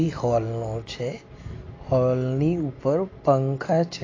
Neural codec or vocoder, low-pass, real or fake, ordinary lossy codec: none; 7.2 kHz; real; AAC, 32 kbps